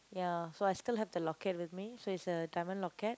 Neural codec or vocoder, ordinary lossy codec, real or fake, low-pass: none; none; real; none